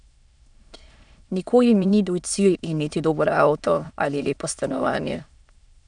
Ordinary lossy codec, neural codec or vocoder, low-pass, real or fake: none; autoencoder, 22.05 kHz, a latent of 192 numbers a frame, VITS, trained on many speakers; 9.9 kHz; fake